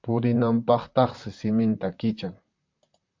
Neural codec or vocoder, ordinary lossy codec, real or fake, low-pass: vocoder, 44.1 kHz, 128 mel bands, Pupu-Vocoder; MP3, 64 kbps; fake; 7.2 kHz